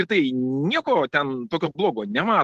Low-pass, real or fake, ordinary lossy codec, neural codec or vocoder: 14.4 kHz; real; Opus, 16 kbps; none